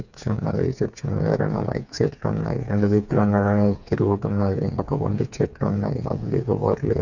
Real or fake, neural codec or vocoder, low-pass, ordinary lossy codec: fake; codec, 16 kHz, 4 kbps, FreqCodec, smaller model; 7.2 kHz; none